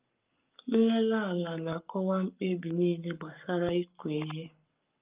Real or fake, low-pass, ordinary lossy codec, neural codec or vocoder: fake; 3.6 kHz; Opus, 24 kbps; codec, 44.1 kHz, 7.8 kbps, Pupu-Codec